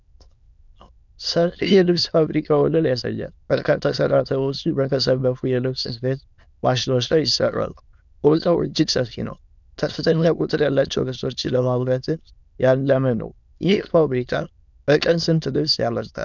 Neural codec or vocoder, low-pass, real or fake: autoencoder, 22.05 kHz, a latent of 192 numbers a frame, VITS, trained on many speakers; 7.2 kHz; fake